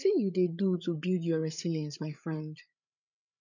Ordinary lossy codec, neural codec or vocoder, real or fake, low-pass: none; codec, 16 kHz, 8 kbps, FreqCodec, larger model; fake; 7.2 kHz